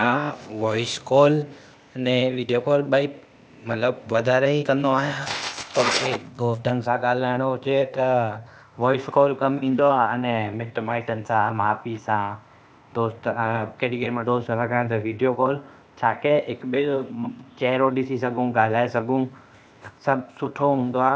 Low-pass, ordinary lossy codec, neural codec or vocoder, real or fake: none; none; codec, 16 kHz, 0.8 kbps, ZipCodec; fake